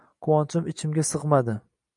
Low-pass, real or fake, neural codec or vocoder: 10.8 kHz; real; none